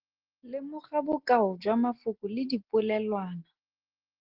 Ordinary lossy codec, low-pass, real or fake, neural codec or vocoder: Opus, 16 kbps; 5.4 kHz; real; none